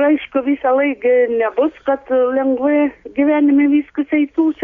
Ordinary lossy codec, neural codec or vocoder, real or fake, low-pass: Opus, 64 kbps; none; real; 7.2 kHz